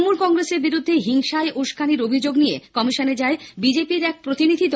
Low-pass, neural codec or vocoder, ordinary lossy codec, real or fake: none; none; none; real